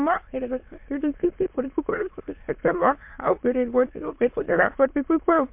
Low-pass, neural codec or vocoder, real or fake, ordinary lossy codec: 3.6 kHz; autoencoder, 22.05 kHz, a latent of 192 numbers a frame, VITS, trained on many speakers; fake; MP3, 32 kbps